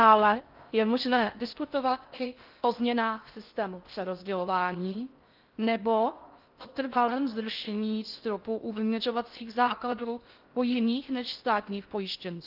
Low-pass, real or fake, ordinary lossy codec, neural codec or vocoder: 5.4 kHz; fake; Opus, 32 kbps; codec, 16 kHz in and 24 kHz out, 0.6 kbps, FocalCodec, streaming, 4096 codes